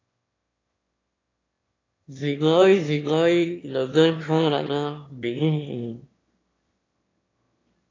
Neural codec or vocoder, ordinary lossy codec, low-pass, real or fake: autoencoder, 22.05 kHz, a latent of 192 numbers a frame, VITS, trained on one speaker; AAC, 32 kbps; 7.2 kHz; fake